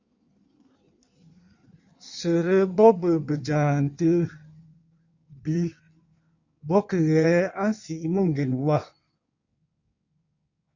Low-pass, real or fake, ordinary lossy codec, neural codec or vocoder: 7.2 kHz; fake; Opus, 64 kbps; codec, 16 kHz in and 24 kHz out, 1.1 kbps, FireRedTTS-2 codec